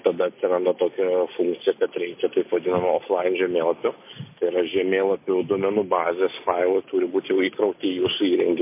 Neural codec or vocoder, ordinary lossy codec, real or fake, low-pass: none; MP3, 24 kbps; real; 3.6 kHz